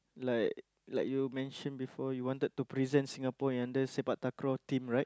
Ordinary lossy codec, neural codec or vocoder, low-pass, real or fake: none; none; none; real